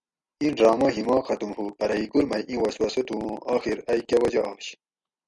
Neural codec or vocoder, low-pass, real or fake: none; 10.8 kHz; real